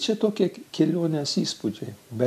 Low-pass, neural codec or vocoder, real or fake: 14.4 kHz; none; real